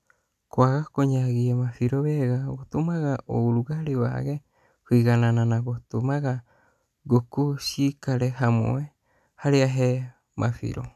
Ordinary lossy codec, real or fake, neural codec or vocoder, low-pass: none; real; none; 14.4 kHz